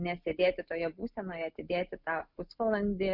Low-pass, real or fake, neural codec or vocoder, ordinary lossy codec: 5.4 kHz; real; none; Opus, 64 kbps